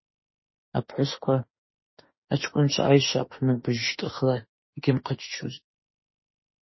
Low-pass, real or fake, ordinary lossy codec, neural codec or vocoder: 7.2 kHz; fake; MP3, 24 kbps; autoencoder, 48 kHz, 32 numbers a frame, DAC-VAE, trained on Japanese speech